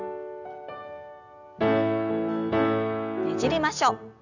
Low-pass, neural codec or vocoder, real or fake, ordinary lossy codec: 7.2 kHz; none; real; none